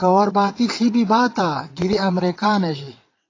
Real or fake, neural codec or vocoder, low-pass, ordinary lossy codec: fake; vocoder, 22.05 kHz, 80 mel bands, WaveNeXt; 7.2 kHz; AAC, 32 kbps